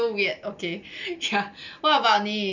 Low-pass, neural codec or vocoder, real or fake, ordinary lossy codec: 7.2 kHz; none; real; none